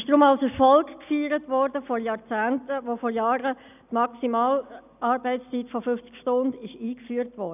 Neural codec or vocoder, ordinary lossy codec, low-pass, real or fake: none; none; 3.6 kHz; real